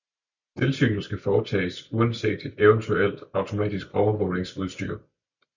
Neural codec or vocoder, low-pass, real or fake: none; 7.2 kHz; real